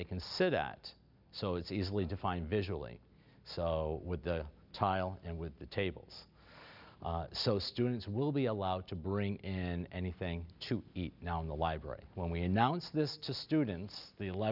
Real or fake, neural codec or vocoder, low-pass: real; none; 5.4 kHz